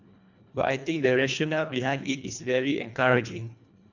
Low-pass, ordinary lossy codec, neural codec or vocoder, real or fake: 7.2 kHz; none; codec, 24 kHz, 1.5 kbps, HILCodec; fake